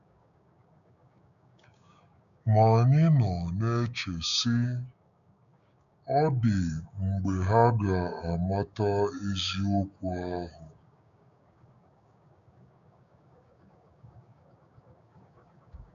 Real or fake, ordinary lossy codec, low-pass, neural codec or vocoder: fake; none; 7.2 kHz; codec, 16 kHz, 6 kbps, DAC